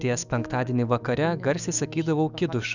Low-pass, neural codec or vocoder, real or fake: 7.2 kHz; autoencoder, 48 kHz, 128 numbers a frame, DAC-VAE, trained on Japanese speech; fake